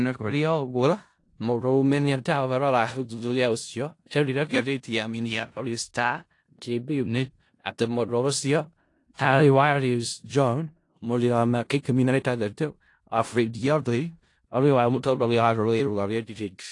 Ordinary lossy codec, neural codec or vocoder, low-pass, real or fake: AAC, 48 kbps; codec, 16 kHz in and 24 kHz out, 0.4 kbps, LongCat-Audio-Codec, four codebook decoder; 10.8 kHz; fake